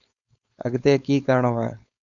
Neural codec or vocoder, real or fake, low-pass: codec, 16 kHz, 4.8 kbps, FACodec; fake; 7.2 kHz